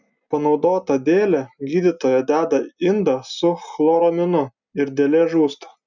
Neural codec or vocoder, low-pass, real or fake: none; 7.2 kHz; real